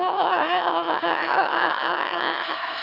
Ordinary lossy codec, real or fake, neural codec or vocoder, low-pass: none; fake; autoencoder, 22.05 kHz, a latent of 192 numbers a frame, VITS, trained on one speaker; 5.4 kHz